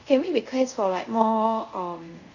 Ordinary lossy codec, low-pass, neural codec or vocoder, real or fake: none; 7.2 kHz; codec, 24 kHz, 0.5 kbps, DualCodec; fake